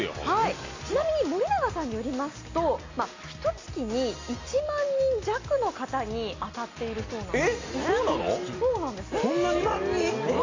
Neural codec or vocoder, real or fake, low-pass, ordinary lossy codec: none; real; 7.2 kHz; AAC, 32 kbps